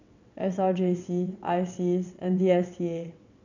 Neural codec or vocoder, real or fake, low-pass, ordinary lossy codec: vocoder, 22.05 kHz, 80 mel bands, WaveNeXt; fake; 7.2 kHz; none